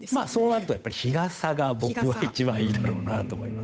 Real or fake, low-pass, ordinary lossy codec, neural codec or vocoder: fake; none; none; codec, 16 kHz, 8 kbps, FunCodec, trained on Chinese and English, 25 frames a second